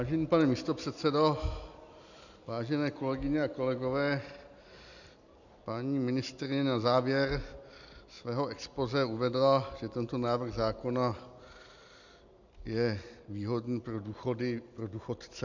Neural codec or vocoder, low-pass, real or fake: none; 7.2 kHz; real